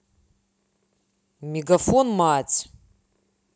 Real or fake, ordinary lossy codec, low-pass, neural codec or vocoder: real; none; none; none